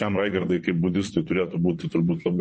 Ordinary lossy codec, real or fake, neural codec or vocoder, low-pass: MP3, 32 kbps; fake; vocoder, 22.05 kHz, 80 mel bands, WaveNeXt; 9.9 kHz